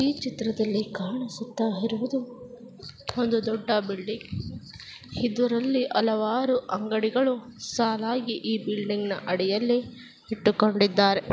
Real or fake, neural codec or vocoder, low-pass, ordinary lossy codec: real; none; none; none